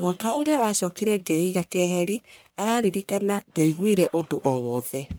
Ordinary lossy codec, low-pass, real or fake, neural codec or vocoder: none; none; fake; codec, 44.1 kHz, 1.7 kbps, Pupu-Codec